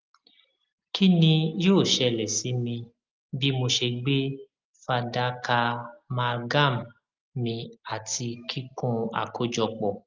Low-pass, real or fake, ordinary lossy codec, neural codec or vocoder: 7.2 kHz; real; Opus, 24 kbps; none